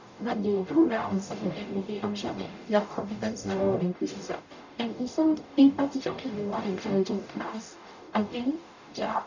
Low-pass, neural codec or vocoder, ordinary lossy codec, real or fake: 7.2 kHz; codec, 44.1 kHz, 0.9 kbps, DAC; none; fake